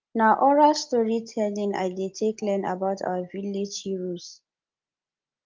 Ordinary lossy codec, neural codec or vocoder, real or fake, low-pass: Opus, 32 kbps; none; real; 7.2 kHz